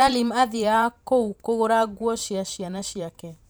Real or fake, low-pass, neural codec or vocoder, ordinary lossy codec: fake; none; vocoder, 44.1 kHz, 128 mel bands every 512 samples, BigVGAN v2; none